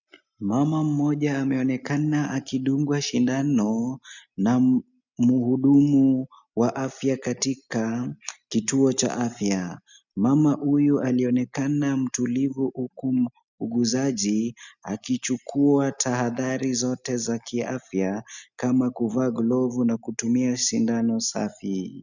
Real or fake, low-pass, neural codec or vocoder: real; 7.2 kHz; none